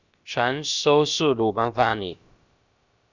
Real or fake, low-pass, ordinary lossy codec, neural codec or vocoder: fake; 7.2 kHz; Opus, 64 kbps; codec, 16 kHz, about 1 kbps, DyCAST, with the encoder's durations